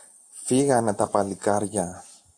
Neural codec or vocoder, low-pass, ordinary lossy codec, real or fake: none; 9.9 kHz; AAC, 64 kbps; real